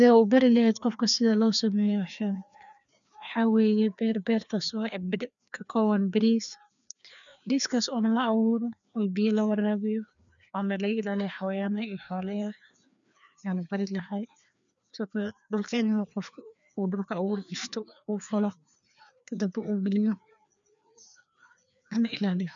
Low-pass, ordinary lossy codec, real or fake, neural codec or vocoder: 7.2 kHz; none; fake; codec, 16 kHz, 2 kbps, FreqCodec, larger model